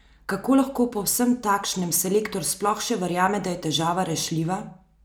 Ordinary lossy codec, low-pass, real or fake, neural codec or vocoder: none; none; real; none